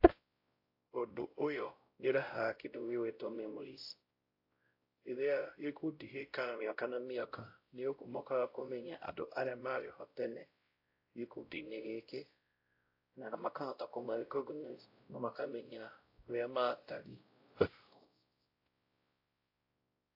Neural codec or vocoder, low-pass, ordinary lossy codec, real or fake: codec, 16 kHz, 0.5 kbps, X-Codec, WavLM features, trained on Multilingual LibriSpeech; 5.4 kHz; AAC, 32 kbps; fake